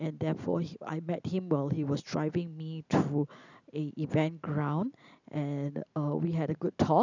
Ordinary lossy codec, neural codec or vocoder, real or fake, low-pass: none; none; real; 7.2 kHz